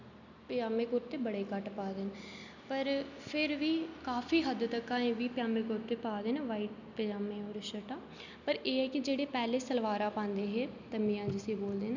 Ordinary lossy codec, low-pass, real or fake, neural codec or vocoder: none; 7.2 kHz; real; none